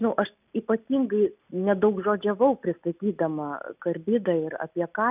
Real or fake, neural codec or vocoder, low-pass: real; none; 3.6 kHz